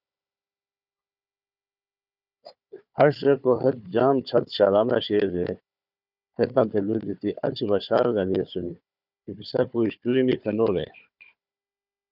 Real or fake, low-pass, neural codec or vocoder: fake; 5.4 kHz; codec, 16 kHz, 4 kbps, FunCodec, trained on Chinese and English, 50 frames a second